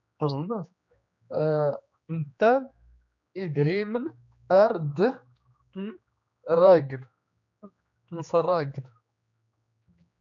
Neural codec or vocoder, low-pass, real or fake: codec, 16 kHz, 2 kbps, X-Codec, HuBERT features, trained on general audio; 7.2 kHz; fake